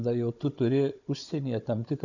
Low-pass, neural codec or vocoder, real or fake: 7.2 kHz; codec, 16 kHz, 16 kbps, FunCodec, trained on Chinese and English, 50 frames a second; fake